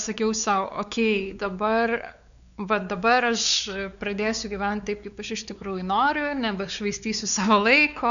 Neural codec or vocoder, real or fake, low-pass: codec, 16 kHz, 4 kbps, X-Codec, WavLM features, trained on Multilingual LibriSpeech; fake; 7.2 kHz